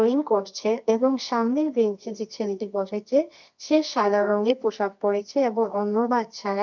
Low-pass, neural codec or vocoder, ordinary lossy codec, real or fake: 7.2 kHz; codec, 24 kHz, 0.9 kbps, WavTokenizer, medium music audio release; none; fake